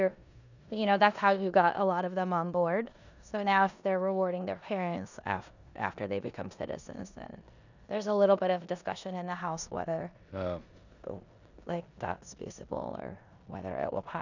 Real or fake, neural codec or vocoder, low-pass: fake; codec, 16 kHz in and 24 kHz out, 0.9 kbps, LongCat-Audio-Codec, four codebook decoder; 7.2 kHz